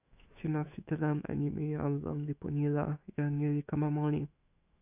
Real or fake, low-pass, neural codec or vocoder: fake; 3.6 kHz; autoencoder, 48 kHz, 128 numbers a frame, DAC-VAE, trained on Japanese speech